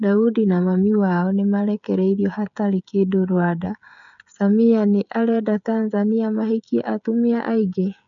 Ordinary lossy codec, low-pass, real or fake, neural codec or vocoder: none; 7.2 kHz; fake; codec, 16 kHz, 16 kbps, FreqCodec, smaller model